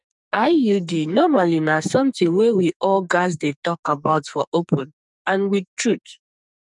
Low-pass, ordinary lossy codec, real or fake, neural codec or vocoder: 10.8 kHz; none; fake; codec, 44.1 kHz, 2.6 kbps, SNAC